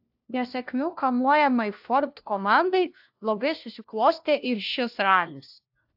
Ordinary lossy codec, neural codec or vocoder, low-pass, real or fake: AAC, 48 kbps; codec, 16 kHz, 1 kbps, FunCodec, trained on LibriTTS, 50 frames a second; 5.4 kHz; fake